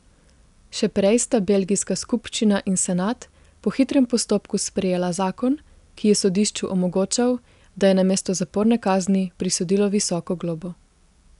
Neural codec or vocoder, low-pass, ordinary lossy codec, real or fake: none; 10.8 kHz; none; real